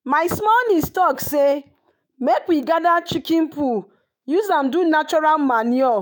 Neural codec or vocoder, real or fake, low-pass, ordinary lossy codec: autoencoder, 48 kHz, 128 numbers a frame, DAC-VAE, trained on Japanese speech; fake; none; none